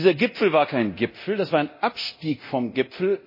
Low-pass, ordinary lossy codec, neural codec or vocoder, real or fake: 5.4 kHz; MP3, 24 kbps; codec, 24 kHz, 0.9 kbps, DualCodec; fake